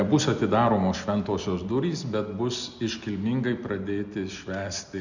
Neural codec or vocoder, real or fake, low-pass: none; real; 7.2 kHz